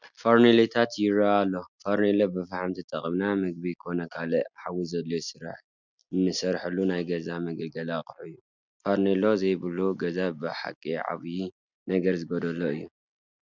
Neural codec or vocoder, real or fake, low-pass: none; real; 7.2 kHz